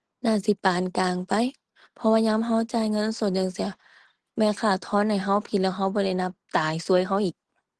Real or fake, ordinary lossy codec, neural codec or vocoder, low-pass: real; Opus, 16 kbps; none; 10.8 kHz